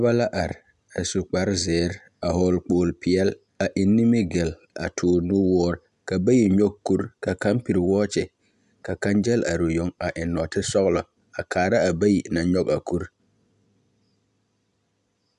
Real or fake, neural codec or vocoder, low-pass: real; none; 10.8 kHz